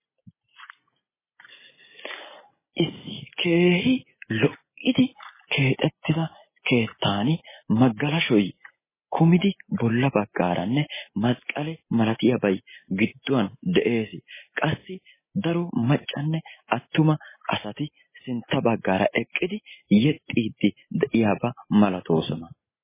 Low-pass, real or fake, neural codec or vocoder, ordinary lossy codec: 3.6 kHz; real; none; MP3, 16 kbps